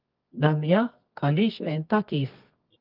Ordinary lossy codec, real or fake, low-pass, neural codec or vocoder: Opus, 32 kbps; fake; 5.4 kHz; codec, 24 kHz, 0.9 kbps, WavTokenizer, medium music audio release